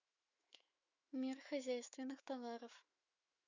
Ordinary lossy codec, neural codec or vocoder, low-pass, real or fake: Opus, 64 kbps; autoencoder, 48 kHz, 128 numbers a frame, DAC-VAE, trained on Japanese speech; 7.2 kHz; fake